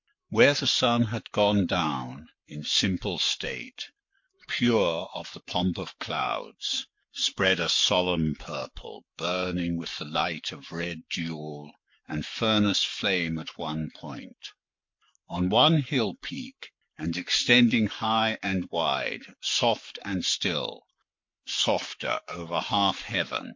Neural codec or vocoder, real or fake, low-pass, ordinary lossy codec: codec, 44.1 kHz, 7.8 kbps, Pupu-Codec; fake; 7.2 kHz; MP3, 48 kbps